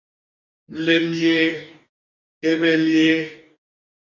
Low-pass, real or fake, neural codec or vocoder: 7.2 kHz; fake; codec, 44.1 kHz, 2.6 kbps, DAC